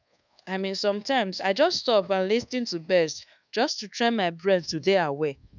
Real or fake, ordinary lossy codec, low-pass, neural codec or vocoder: fake; none; 7.2 kHz; codec, 24 kHz, 1.2 kbps, DualCodec